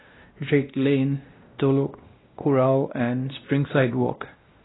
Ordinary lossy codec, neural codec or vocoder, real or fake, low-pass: AAC, 16 kbps; codec, 16 kHz, 1 kbps, X-Codec, WavLM features, trained on Multilingual LibriSpeech; fake; 7.2 kHz